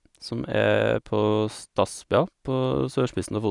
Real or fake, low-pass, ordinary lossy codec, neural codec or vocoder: real; 10.8 kHz; none; none